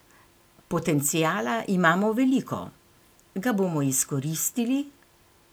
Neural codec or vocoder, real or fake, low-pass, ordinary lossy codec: none; real; none; none